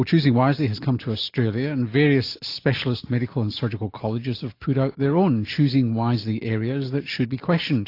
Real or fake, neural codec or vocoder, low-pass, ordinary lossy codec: real; none; 5.4 kHz; AAC, 32 kbps